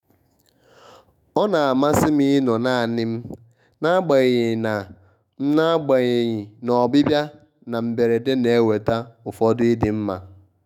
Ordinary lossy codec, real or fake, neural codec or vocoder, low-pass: none; fake; autoencoder, 48 kHz, 128 numbers a frame, DAC-VAE, trained on Japanese speech; 19.8 kHz